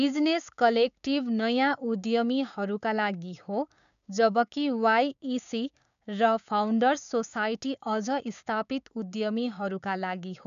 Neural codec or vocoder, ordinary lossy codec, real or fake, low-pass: codec, 16 kHz, 6 kbps, DAC; none; fake; 7.2 kHz